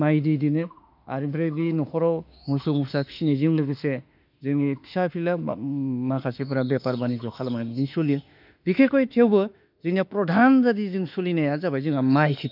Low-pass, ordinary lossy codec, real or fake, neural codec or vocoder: 5.4 kHz; none; fake; autoencoder, 48 kHz, 32 numbers a frame, DAC-VAE, trained on Japanese speech